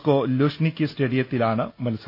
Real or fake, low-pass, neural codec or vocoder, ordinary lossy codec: real; 5.4 kHz; none; AAC, 32 kbps